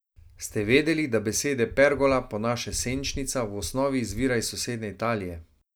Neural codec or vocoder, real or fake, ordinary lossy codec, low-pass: none; real; none; none